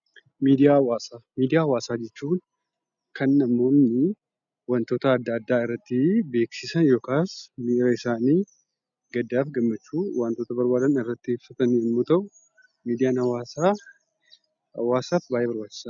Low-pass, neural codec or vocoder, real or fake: 7.2 kHz; none; real